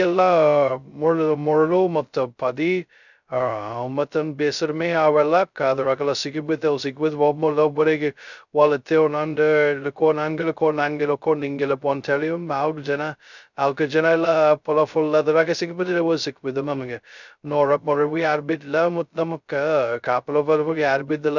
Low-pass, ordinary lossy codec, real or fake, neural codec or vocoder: 7.2 kHz; none; fake; codec, 16 kHz, 0.2 kbps, FocalCodec